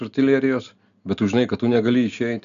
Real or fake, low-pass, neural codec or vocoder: real; 7.2 kHz; none